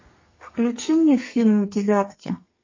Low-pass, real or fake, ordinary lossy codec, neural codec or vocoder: 7.2 kHz; fake; MP3, 32 kbps; codec, 32 kHz, 1.9 kbps, SNAC